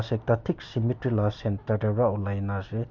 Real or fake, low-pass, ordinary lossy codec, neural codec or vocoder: real; 7.2 kHz; MP3, 48 kbps; none